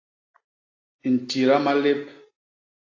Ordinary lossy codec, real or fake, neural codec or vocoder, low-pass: AAC, 32 kbps; real; none; 7.2 kHz